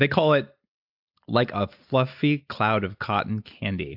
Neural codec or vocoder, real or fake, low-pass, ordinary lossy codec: none; real; 5.4 kHz; AAC, 48 kbps